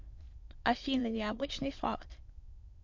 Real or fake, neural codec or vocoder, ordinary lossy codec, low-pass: fake; autoencoder, 22.05 kHz, a latent of 192 numbers a frame, VITS, trained on many speakers; MP3, 48 kbps; 7.2 kHz